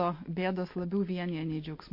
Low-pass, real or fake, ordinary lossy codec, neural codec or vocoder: 5.4 kHz; real; MP3, 32 kbps; none